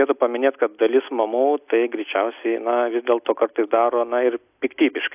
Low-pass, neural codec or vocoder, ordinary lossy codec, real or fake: 3.6 kHz; none; AAC, 32 kbps; real